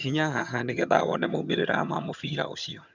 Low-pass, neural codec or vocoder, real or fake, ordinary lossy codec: 7.2 kHz; vocoder, 22.05 kHz, 80 mel bands, HiFi-GAN; fake; none